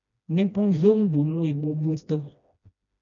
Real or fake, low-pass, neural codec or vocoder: fake; 7.2 kHz; codec, 16 kHz, 1 kbps, FreqCodec, smaller model